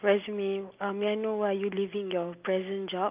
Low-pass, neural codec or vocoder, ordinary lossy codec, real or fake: 3.6 kHz; none; Opus, 24 kbps; real